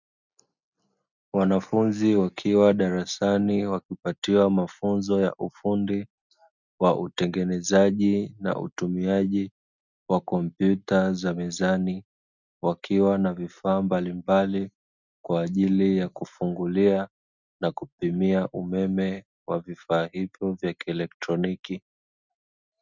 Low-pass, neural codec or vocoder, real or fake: 7.2 kHz; none; real